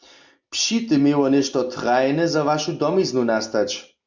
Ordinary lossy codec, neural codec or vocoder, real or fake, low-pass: MP3, 64 kbps; none; real; 7.2 kHz